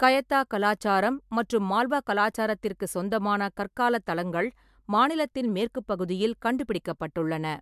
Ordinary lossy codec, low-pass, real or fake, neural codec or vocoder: MP3, 96 kbps; 14.4 kHz; real; none